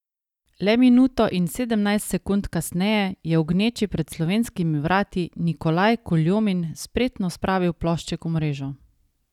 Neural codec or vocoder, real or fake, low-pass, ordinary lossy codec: none; real; 19.8 kHz; none